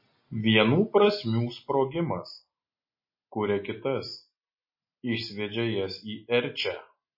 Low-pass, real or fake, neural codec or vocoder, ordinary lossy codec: 5.4 kHz; real; none; MP3, 24 kbps